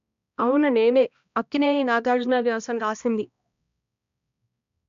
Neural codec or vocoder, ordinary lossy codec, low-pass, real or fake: codec, 16 kHz, 1 kbps, X-Codec, HuBERT features, trained on balanced general audio; none; 7.2 kHz; fake